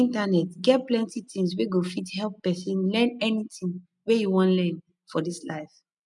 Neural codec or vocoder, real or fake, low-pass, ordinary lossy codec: none; real; 10.8 kHz; none